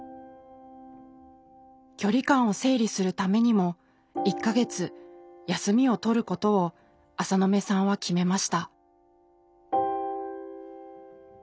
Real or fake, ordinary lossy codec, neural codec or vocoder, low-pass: real; none; none; none